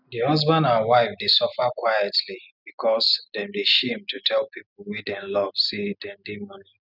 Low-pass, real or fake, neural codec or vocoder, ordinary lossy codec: 5.4 kHz; real; none; none